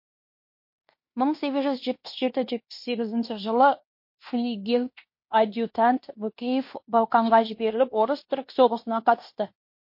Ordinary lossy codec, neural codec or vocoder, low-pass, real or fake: MP3, 32 kbps; codec, 16 kHz in and 24 kHz out, 0.9 kbps, LongCat-Audio-Codec, fine tuned four codebook decoder; 5.4 kHz; fake